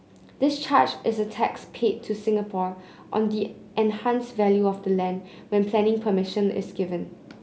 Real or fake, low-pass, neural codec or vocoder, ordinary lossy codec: real; none; none; none